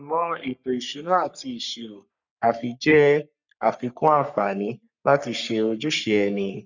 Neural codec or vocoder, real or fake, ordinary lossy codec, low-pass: codec, 44.1 kHz, 3.4 kbps, Pupu-Codec; fake; none; 7.2 kHz